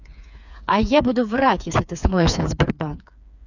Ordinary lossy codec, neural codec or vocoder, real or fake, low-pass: none; codec, 16 kHz, 4 kbps, FreqCodec, smaller model; fake; 7.2 kHz